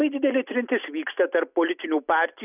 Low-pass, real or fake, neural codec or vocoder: 3.6 kHz; real; none